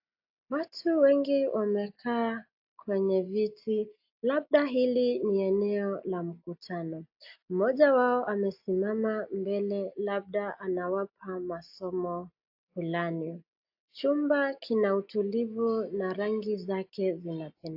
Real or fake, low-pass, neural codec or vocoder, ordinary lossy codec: real; 5.4 kHz; none; AAC, 48 kbps